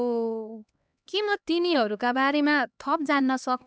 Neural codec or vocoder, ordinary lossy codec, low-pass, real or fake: codec, 16 kHz, 2 kbps, X-Codec, HuBERT features, trained on LibriSpeech; none; none; fake